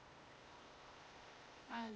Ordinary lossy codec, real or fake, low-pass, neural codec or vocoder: none; fake; none; codec, 16 kHz, 0.8 kbps, ZipCodec